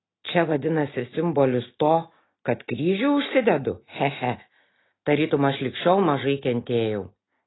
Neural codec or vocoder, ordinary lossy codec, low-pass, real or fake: none; AAC, 16 kbps; 7.2 kHz; real